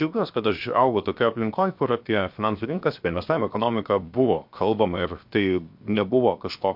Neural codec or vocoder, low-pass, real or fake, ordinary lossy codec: codec, 16 kHz, 0.7 kbps, FocalCodec; 5.4 kHz; fake; MP3, 48 kbps